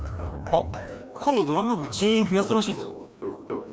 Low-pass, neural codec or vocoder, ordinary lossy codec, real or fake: none; codec, 16 kHz, 1 kbps, FreqCodec, larger model; none; fake